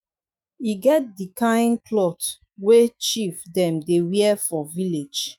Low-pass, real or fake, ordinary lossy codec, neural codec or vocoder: none; fake; none; autoencoder, 48 kHz, 128 numbers a frame, DAC-VAE, trained on Japanese speech